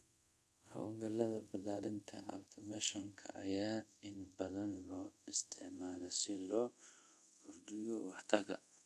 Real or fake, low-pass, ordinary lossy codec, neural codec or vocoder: fake; none; none; codec, 24 kHz, 0.5 kbps, DualCodec